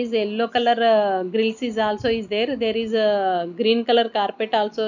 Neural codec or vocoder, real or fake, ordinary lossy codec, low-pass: none; real; none; 7.2 kHz